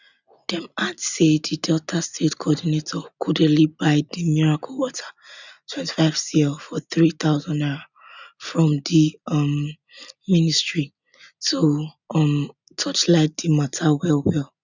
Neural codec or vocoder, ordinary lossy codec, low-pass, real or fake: none; none; 7.2 kHz; real